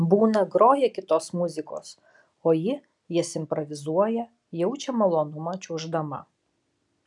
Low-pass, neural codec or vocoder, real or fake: 10.8 kHz; none; real